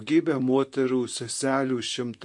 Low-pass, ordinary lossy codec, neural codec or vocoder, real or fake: 10.8 kHz; MP3, 48 kbps; vocoder, 44.1 kHz, 128 mel bands, Pupu-Vocoder; fake